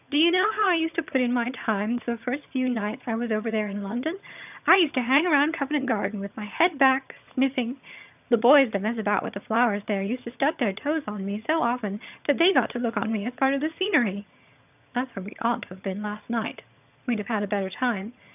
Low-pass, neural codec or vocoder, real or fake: 3.6 kHz; vocoder, 22.05 kHz, 80 mel bands, HiFi-GAN; fake